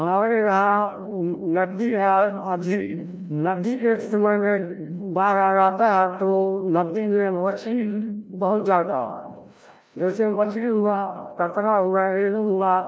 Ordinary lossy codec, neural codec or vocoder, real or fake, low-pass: none; codec, 16 kHz, 0.5 kbps, FreqCodec, larger model; fake; none